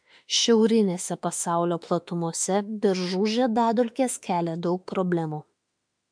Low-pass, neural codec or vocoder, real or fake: 9.9 kHz; autoencoder, 48 kHz, 32 numbers a frame, DAC-VAE, trained on Japanese speech; fake